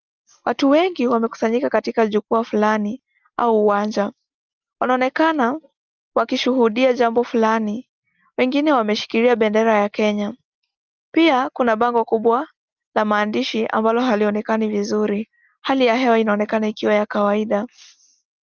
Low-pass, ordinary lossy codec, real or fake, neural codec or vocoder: 7.2 kHz; Opus, 32 kbps; real; none